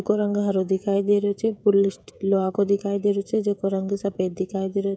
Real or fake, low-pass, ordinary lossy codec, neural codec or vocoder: fake; none; none; codec, 16 kHz, 16 kbps, FreqCodec, smaller model